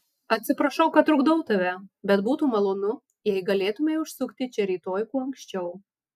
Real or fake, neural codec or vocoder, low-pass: real; none; 14.4 kHz